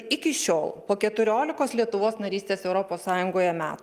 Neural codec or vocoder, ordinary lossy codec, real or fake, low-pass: none; Opus, 32 kbps; real; 14.4 kHz